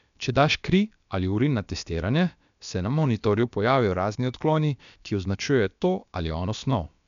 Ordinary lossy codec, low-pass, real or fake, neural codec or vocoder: none; 7.2 kHz; fake; codec, 16 kHz, about 1 kbps, DyCAST, with the encoder's durations